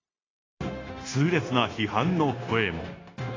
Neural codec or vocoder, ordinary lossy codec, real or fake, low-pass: codec, 16 kHz, 0.9 kbps, LongCat-Audio-Codec; AAC, 32 kbps; fake; 7.2 kHz